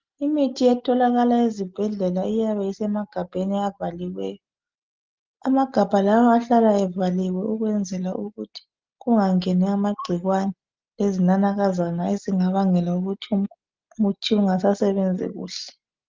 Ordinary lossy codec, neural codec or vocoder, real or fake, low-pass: Opus, 24 kbps; none; real; 7.2 kHz